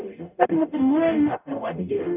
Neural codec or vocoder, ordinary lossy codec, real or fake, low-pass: codec, 44.1 kHz, 0.9 kbps, DAC; none; fake; 3.6 kHz